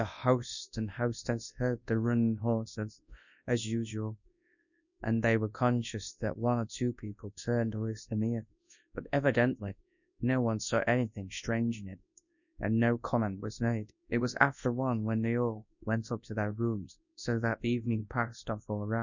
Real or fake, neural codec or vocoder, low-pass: fake; codec, 24 kHz, 0.9 kbps, WavTokenizer, large speech release; 7.2 kHz